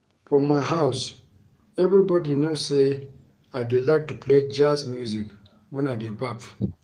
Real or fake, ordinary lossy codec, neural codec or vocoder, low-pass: fake; Opus, 32 kbps; codec, 32 kHz, 1.9 kbps, SNAC; 14.4 kHz